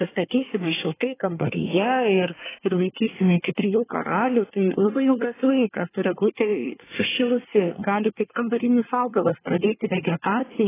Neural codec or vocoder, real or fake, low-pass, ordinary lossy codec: codec, 24 kHz, 1 kbps, SNAC; fake; 3.6 kHz; AAC, 16 kbps